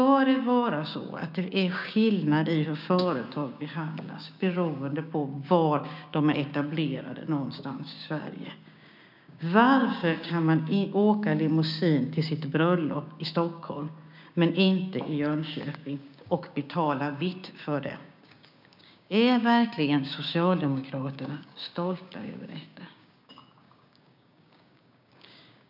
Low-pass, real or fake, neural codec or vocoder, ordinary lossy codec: 5.4 kHz; fake; codec, 16 kHz, 6 kbps, DAC; none